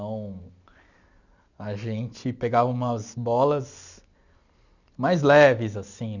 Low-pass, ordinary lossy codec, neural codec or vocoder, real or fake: 7.2 kHz; none; none; real